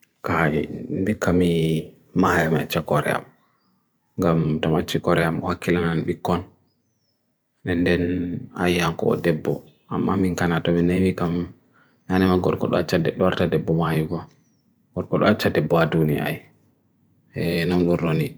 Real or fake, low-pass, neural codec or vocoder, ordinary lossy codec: fake; none; vocoder, 44.1 kHz, 128 mel bands every 512 samples, BigVGAN v2; none